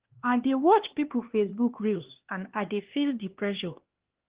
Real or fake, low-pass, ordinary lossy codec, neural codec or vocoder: fake; 3.6 kHz; Opus, 16 kbps; codec, 16 kHz, 2 kbps, X-Codec, HuBERT features, trained on LibriSpeech